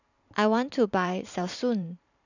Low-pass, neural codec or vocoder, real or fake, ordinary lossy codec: 7.2 kHz; vocoder, 44.1 kHz, 128 mel bands every 512 samples, BigVGAN v2; fake; none